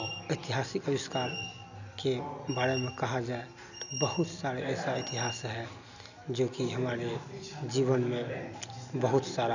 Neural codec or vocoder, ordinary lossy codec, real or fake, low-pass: none; none; real; 7.2 kHz